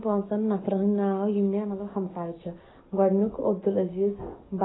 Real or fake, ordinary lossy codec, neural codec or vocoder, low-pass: fake; AAC, 16 kbps; codec, 44.1 kHz, 7.8 kbps, DAC; 7.2 kHz